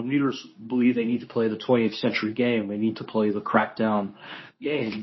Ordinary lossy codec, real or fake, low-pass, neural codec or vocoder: MP3, 24 kbps; fake; 7.2 kHz; codec, 24 kHz, 0.9 kbps, WavTokenizer, medium speech release version 2